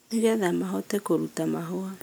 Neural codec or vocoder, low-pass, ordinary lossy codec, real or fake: none; none; none; real